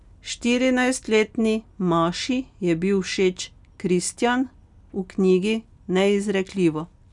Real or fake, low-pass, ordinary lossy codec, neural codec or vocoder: real; 10.8 kHz; none; none